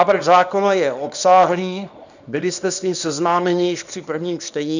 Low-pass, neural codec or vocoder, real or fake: 7.2 kHz; codec, 24 kHz, 0.9 kbps, WavTokenizer, small release; fake